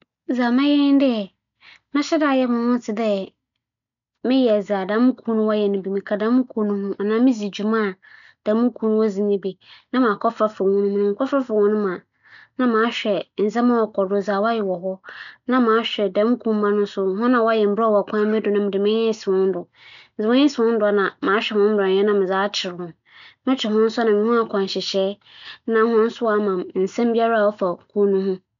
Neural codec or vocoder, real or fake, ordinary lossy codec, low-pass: none; real; none; 7.2 kHz